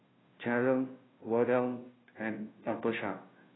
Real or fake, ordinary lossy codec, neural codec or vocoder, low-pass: fake; AAC, 16 kbps; codec, 16 kHz, 0.5 kbps, FunCodec, trained on Chinese and English, 25 frames a second; 7.2 kHz